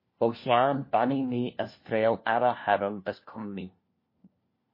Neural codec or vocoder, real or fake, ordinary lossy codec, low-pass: codec, 16 kHz, 1 kbps, FunCodec, trained on LibriTTS, 50 frames a second; fake; MP3, 24 kbps; 5.4 kHz